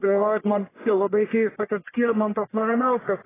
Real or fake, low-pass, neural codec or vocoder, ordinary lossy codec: fake; 3.6 kHz; codec, 44.1 kHz, 1.7 kbps, Pupu-Codec; AAC, 16 kbps